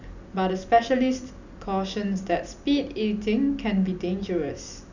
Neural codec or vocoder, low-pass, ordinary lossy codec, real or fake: none; 7.2 kHz; none; real